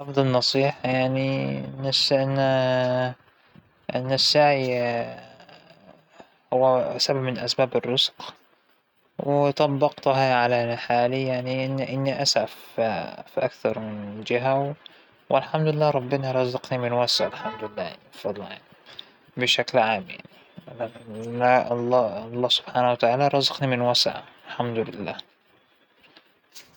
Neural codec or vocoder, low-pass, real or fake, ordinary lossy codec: none; 19.8 kHz; real; none